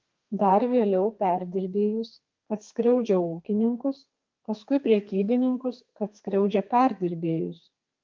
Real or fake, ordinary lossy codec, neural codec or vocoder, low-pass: fake; Opus, 32 kbps; codec, 32 kHz, 1.9 kbps, SNAC; 7.2 kHz